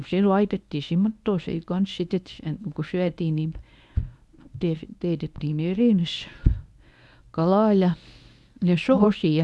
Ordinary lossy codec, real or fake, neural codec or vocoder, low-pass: none; fake; codec, 24 kHz, 0.9 kbps, WavTokenizer, medium speech release version 1; none